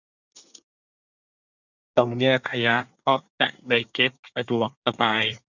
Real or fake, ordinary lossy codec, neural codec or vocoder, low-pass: fake; none; codec, 24 kHz, 1 kbps, SNAC; 7.2 kHz